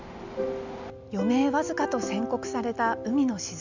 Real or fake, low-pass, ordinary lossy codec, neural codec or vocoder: real; 7.2 kHz; none; none